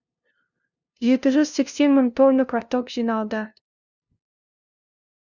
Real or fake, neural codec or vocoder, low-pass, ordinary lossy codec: fake; codec, 16 kHz, 0.5 kbps, FunCodec, trained on LibriTTS, 25 frames a second; 7.2 kHz; Opus, 64 kbps